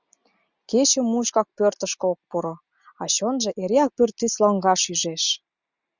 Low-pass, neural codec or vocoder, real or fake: 7.2 kHz; none; real